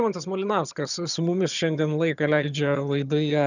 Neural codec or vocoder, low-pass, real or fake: vocoder, 22.05 kHz, 80 mel bands, HiFi-GAN; 7.2 kHz; fake